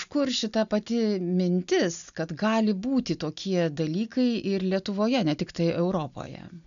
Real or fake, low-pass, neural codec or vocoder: real; 7.2 kHz; none